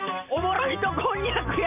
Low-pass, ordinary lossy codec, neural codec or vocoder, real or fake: 3.6 kHz; AAC, 32 kbps; vocoder, 44.1 kHz, 128 mel bands every 512 samples, BigVGAN v2; fake